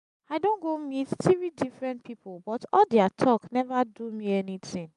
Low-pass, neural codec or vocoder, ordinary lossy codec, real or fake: 10.8 kHz; none; none; real